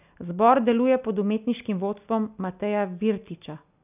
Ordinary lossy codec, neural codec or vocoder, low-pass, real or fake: none; none; 3.6 kHz; real